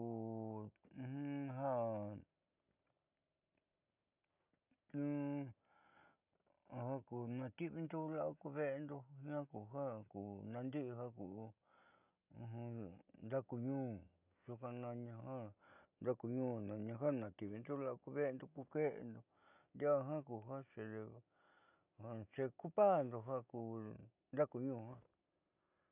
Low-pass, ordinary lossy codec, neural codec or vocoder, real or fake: 3.6 kHz; none; none; real